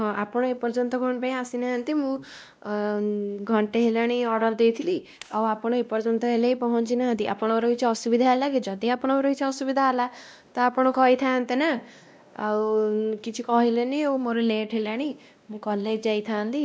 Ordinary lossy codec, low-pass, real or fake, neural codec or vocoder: none; none; fake; codec, 16 kHz, 1 kbps, X-Codec, WavLM features, trained on Multilingual LibriSpeech